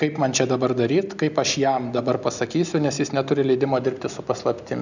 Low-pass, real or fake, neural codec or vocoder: 7.2 kHz; real; none